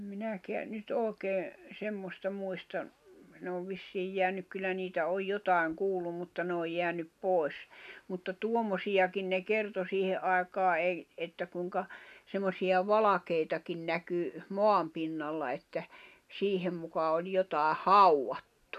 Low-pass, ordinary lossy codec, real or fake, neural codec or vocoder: 14.4 kHz; none; real; none